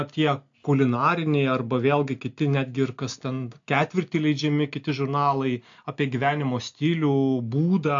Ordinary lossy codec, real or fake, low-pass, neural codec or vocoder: AAC, 64 kbps; real; 7.2 kHz; none